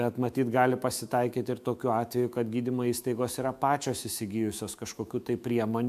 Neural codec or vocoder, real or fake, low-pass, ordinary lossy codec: autoencoder, 48 kHz, 128 numbers a frame, DAC-VAE, trained on Japanese speech; fake; 14.4 kHz; MP3, 96 kbps